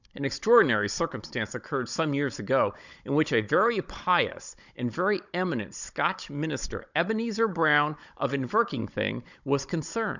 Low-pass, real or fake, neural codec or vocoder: 7.2 kHz; fake; codec, 16 kHz, 16 kbps, FunCodec, trained on Chinese and English, 50 frames a second